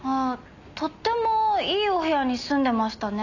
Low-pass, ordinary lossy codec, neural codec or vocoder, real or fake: 7.2 kHz; none; none; real